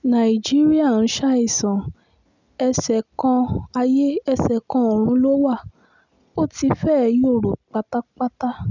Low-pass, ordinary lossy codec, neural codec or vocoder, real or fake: 7.2 kHz; none; none; real